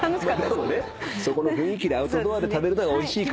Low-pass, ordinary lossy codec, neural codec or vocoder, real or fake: none; none; none; real